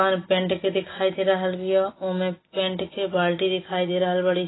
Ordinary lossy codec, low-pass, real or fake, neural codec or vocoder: AAC, 16 kbps; 7.2 kHz; real; none